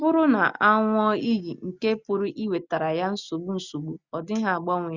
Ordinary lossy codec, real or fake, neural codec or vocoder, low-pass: Opus, 64 kbps; real; none; 7.2 kHz